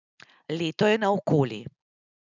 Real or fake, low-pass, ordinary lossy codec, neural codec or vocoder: real; 7.2 kHz; none; none